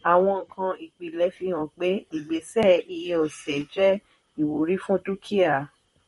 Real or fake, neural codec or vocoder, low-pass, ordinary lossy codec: fake; vocoder, 44.1 kHz, 128 mel bands, Pupu-Vocoder; 19.8 kHz; MP3, 48 kbps